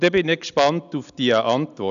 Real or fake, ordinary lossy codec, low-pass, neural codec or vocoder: real; none; 7.2 kHz; none